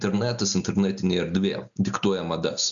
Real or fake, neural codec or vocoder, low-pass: real; none; 7.2 kHz